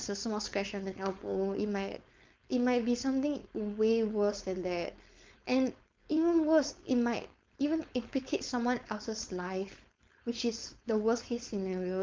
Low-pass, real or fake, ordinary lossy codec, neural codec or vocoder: 7.2 kHz; fake; Opus, 32 kbps; codec, 16 kHz, 4.8 kbps, FACodec